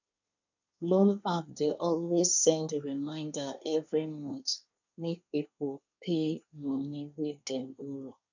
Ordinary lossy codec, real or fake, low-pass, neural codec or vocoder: none; fake; 7.2 kHz; codec, 24 kHz, 1 kbps, SNAC